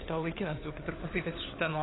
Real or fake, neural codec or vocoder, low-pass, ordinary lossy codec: fake; codec, 16 kHz, 4 kbps, FunCodec, trained on LibriTTS, 50 frames a second; 7.2 kHz; AAC, 16 kbps